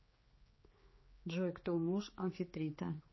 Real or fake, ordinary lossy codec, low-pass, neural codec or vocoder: fake; MP3, 24 kbps; 7.2 kHz; codec, 16 kHz, 4 kbps, X-Codec, HuBERT features, trained on general audio